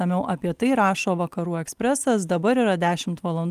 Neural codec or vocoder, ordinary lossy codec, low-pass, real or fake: none; Opus, 32 kbps; 14.4 kHz; real